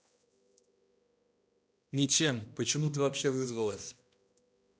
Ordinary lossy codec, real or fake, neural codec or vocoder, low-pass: none; fake; codec, 16 kHz, 1 kbps, X-Codec, HuBERT features, trained on balanced general audio; none